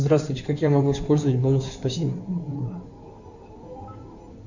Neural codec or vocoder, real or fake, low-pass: codec, 16 kHz in and 24 kHz out, 2.2 kbps, FireRedTTS-2 codec; fake; 7.2 kHz